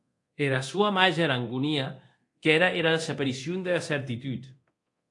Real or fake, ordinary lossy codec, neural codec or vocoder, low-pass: fake; AAC, 48 kbps; codec, 24 kHz, 0.9 kbps, DualCodec; 10.8 kHz